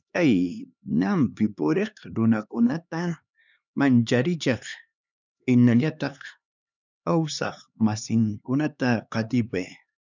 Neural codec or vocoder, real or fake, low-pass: codec, 16 kHz, 2 kbps, X-Codec, HuBERT features, trained on LibriSpeech; fake; 7.2 kHz